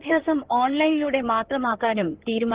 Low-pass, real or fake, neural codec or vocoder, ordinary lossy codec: 3.6 kHz; fake; codec, 16 kHz in and 24 kHz out, 2.2 kbps, FireRedTTS-2 codec; Opus, 16 kbps